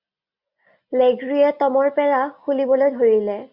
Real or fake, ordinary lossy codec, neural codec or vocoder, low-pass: real; MP3, 48 kbps; none; 5.4 kHz